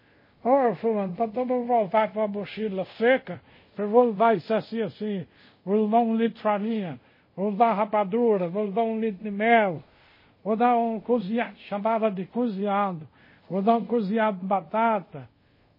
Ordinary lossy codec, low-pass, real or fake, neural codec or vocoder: MP3, 24 kbps; 5.4 kHz; fake; codec, 24 kHz, 0.5 kbps, DualCodec